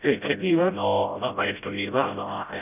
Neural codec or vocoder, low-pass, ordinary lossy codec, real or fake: codec, 16 kHz, 0.5 kbps, FreqCodec, smaller model; 3.6 kHz; none; fake